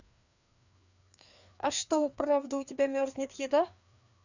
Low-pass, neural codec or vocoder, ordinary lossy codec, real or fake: 7.2 kHz; codec, 16 kHz, 2 kbps, FreqCodec, larger model; none; fake